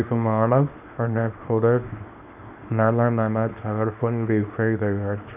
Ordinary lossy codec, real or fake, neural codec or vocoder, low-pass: none; fake; codec, 24 kHz, 0.9 kbps, WavTokenizer, small release; 3.6 kHz